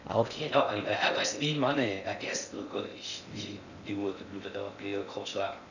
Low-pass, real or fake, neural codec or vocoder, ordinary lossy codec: 7.2 kHz; fake; codec, 16 kHz in and 24 kHz out, 0.6 kbps, FocalCodec, streaming, 4096 codes; none